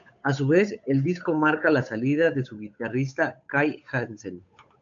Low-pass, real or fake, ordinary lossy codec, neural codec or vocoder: 7.2 kHz; fake; AAC, 64 kbps; codec, 16 kHz, 8 kbps, FunCodec, trained on Chinese and English, 25 frames a second